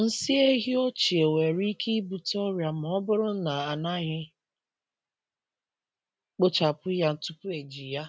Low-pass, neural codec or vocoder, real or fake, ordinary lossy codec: none; none; real; none